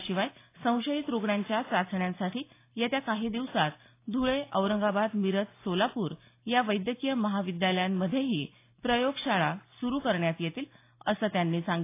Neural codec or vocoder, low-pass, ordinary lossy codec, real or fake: none; 3.6 kHz; AAC, 24 kbps; real